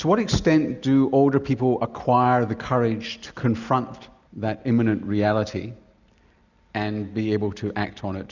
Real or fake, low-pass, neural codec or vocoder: real; 7.2 kHz; none